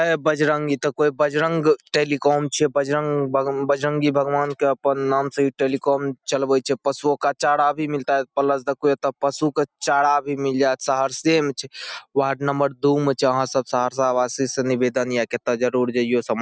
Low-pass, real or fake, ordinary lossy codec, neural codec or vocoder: none; real; none; none